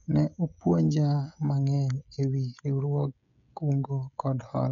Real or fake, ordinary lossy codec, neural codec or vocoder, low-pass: fake; none; codec, 16 kHz, 16 kbps, FreqCodec, smaller model; 7.2 kHz